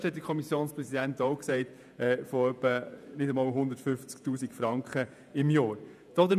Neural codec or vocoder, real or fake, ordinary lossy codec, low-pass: vocoder, 48 kHz, 128 mel bands, Vocos; fake; none; 14.4 kHz